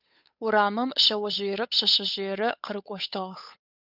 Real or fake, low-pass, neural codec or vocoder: fake; 5.4 kHz; codec, 16 kHz, 8 kbps, FunCodec, trained on Chinese and English, 25 frames a second